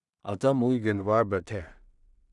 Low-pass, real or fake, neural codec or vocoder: 10.8 kHz; fake; codec, 16 kHz in and 24 kHz out, 0.4 kbps, LongCat-Audio-Codec, two codebook decoder